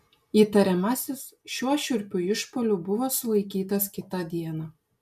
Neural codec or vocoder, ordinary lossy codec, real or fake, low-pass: none; MP3, 96 kbps; real; 14.4 kHz